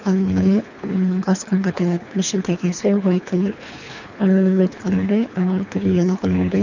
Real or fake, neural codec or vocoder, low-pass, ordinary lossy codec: fake; codec, 24 kHz, 3 kbps, HILCodec; 7.2 kHz; none